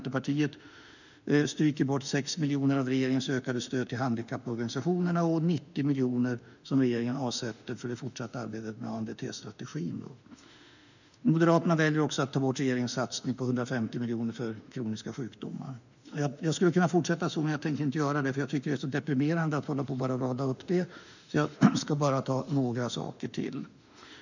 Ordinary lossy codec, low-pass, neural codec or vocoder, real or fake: none; 7.2 kHz; autoencoder, 48 kHz, 32 numbers a frame, DAC-VAE, trained on Japanese speech; fake